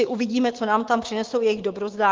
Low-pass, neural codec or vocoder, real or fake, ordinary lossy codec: 7.2 kHz; autoencoder, 48 kHz, 128 numbers a frame, DAC-VAE, trained on Japanese speech; fake; Opus, 16 kbps